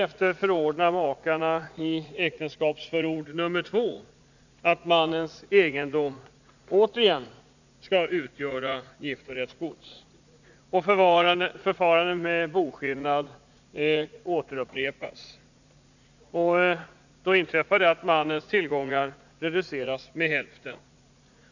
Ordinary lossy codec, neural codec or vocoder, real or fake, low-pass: none; vocoder, 44.1 kHz, 80 mel bands, Vocos; fake; 7.2 kHz